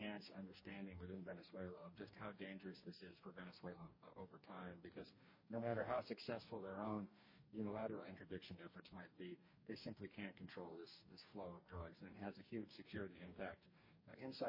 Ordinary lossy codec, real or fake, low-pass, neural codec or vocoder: MP3, 24 kbps; fake; 5.4 kHz; codec, 44.1 kHz, 2.6 kbps, DAC